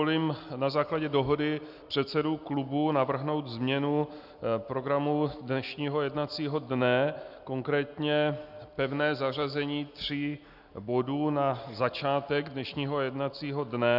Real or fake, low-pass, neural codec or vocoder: real; 5.4 kHz; none